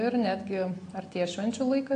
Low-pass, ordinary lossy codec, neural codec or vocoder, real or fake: 9.9 kHz; AAC, 48 kbps; none; real